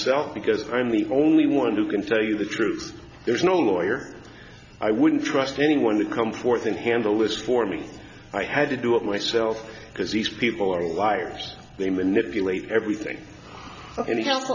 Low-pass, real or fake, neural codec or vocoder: 7.2 kHz; real; none